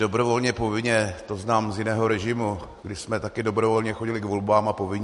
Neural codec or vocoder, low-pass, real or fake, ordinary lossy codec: none; 14.4 kHz; real; MP3, 48 kbps